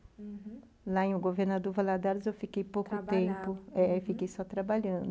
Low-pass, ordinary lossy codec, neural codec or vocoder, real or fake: none; none; none; real